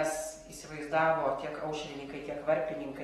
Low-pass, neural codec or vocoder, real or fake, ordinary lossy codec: 19.8 kHz; none; real; AAC, 32 kbps